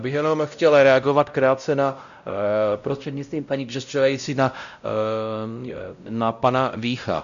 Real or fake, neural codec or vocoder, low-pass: fake; codec, 16 kHz, 0.5 kbps, X-Codec, WavLM features, trained on Multilingual LibriSpeech; 7.2 kHz